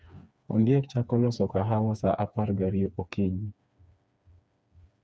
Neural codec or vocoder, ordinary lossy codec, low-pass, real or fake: codec, 16 kHz, 4 kbps, FreqCodec, smaller model; none; none; fake